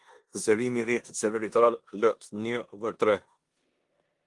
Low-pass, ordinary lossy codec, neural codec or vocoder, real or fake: 10.8 kHz; Opus, 24 kbps; codec, 16 kHz in and 24 kHz out, 0.9 kbps, LongCat-Audio-Codec, four codebook decoder; fake